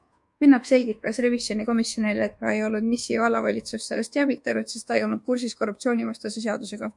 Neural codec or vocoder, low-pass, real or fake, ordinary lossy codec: codec, 24 kHz, 1.2 kbps, DualCodec; 10.8 kHz; fake; MP3, 64 kbps